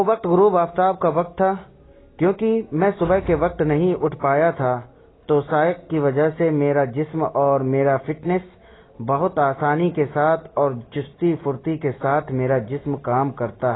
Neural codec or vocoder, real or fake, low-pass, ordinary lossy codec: none; real; 7.2 kHz; AAC, 16 kbps